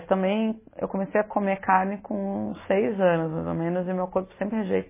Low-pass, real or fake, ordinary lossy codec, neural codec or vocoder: 3.6 kHz; real; MP3, 16 kbps; none